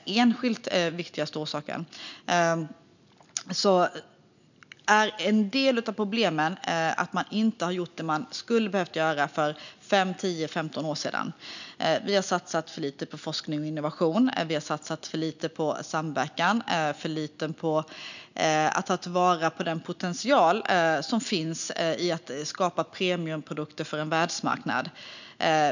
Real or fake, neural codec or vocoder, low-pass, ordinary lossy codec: real; none; 7.2 kHz; none